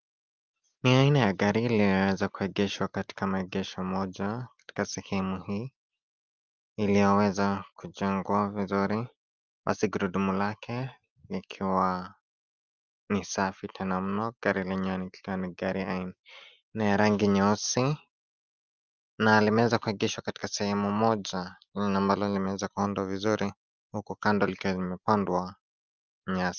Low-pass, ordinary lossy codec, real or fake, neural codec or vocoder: 7.2 kHz; Opus, 32 kbps; real; none